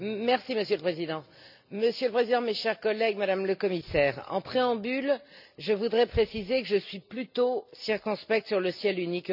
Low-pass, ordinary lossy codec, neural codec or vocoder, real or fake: 5.4 kHz; none; none; real